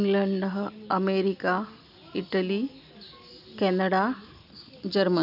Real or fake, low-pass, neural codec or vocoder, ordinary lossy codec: real; 5.4 kHz; none; none